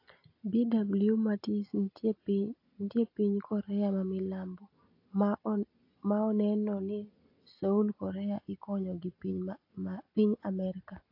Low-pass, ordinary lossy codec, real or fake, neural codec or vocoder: 5.4 kHz; AAC, 32 kbps; real; none